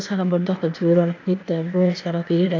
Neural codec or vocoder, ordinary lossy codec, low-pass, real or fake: codec, 16 kHz, 0.8 kbps, ZipCodec; AAC, 48 kbps; 7.2 kHz; fake